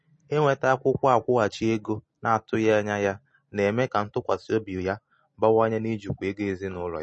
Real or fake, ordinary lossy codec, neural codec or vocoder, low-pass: real; MP3, 32 kbps; none; 9.9 kHz